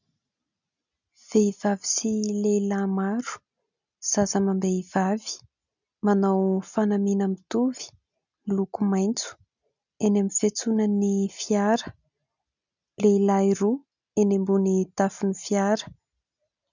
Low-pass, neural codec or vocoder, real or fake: 7.2 kHz; none; real